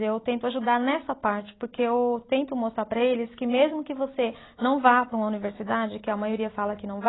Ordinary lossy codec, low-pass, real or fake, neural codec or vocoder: AAC, 16 kbps; 7.2 kHz; real; none